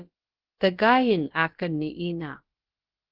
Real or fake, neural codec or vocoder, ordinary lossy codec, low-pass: fake; codec, 16 kHz, about 1 kbps, DyCAST, with the encoder's durations; Opus, 16 kbps; 5.4 kHz